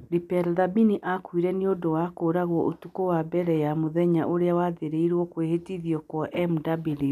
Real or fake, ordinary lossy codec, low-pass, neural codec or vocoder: real; none; 14.4 kHz; none